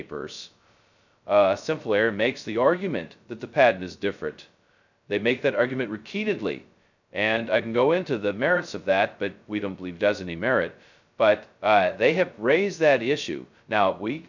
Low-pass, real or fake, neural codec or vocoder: 7.2 kHz; fake; codec, 16 kHz, 0.2 kbps, FocalCodec